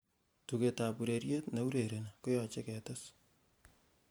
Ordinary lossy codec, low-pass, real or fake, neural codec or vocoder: none; none; fake; vocoder, 44.1 kHz, 128 mel bands every 512 samples, BigVGAN v2